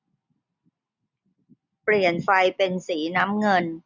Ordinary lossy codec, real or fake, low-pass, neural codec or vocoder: none; real; 7.2 kHz; none